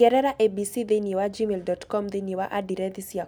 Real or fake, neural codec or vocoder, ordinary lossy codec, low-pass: real; none; none; none